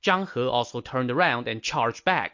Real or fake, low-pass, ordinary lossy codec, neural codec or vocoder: real; 7.2 kHz; MP3, 48 kbps; none